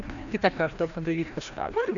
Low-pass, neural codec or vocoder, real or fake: 7.2 kHz; codec, 16 kHz, 1 kbps, FreqCodec, larger model; fake